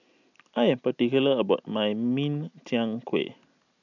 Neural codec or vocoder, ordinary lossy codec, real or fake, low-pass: none; none; real; 7.2 kHz